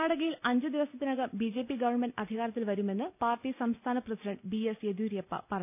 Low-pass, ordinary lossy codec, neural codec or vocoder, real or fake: 3.6 kHz; none; none; real